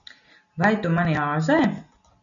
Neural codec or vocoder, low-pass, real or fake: none; 7.2 kHz; real